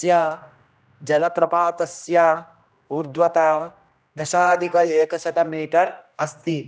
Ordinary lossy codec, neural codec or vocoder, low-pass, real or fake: none; codec, 16 kHz, 1 kbps, X-Codec, HuBERT features, trained on general audio; none; fake